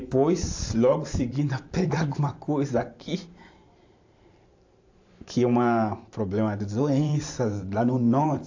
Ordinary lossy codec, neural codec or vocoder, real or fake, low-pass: AAC, 48 kbps; vocoder, 44.1 kHz, 128 mel bands every 512 samples, BigVGAN v2; fake; 7.2 kHz